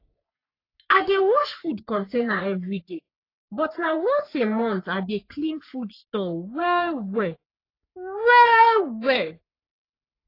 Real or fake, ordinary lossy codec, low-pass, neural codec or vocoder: fake; AAC, 32 kbps; 5.4 kHz; codec, 44.1 kHz, 3.4 kbps, Pupu-Codec